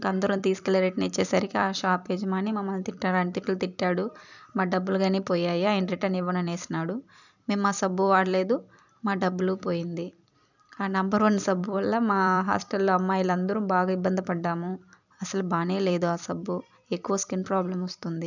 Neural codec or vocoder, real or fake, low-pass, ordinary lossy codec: vocoder, 44.1 kHz, 128 mel bands every 256 samples, BigVGAN v2; fake; 7.2 kHz; none